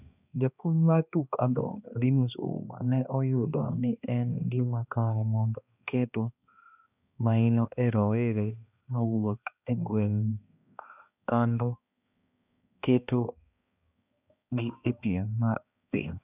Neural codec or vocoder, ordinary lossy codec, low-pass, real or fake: codec, 16 kHz, 2 kbps, X-Codec, HuBERT features, trained on balanced general audio; none; 3.6 kHz; fake